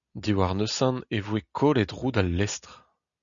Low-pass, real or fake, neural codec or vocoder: 7.2 kHz; real; none